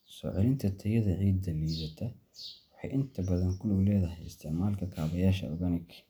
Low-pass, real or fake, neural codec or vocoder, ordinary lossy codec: none; real; none; none